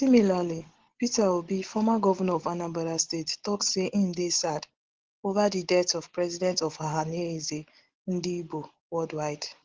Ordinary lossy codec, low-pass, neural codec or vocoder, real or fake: Opus, 16 kbps; 7.2 kHz; none; real